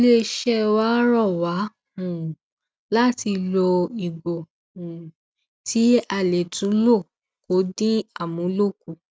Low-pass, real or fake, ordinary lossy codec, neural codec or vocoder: none; real; none; none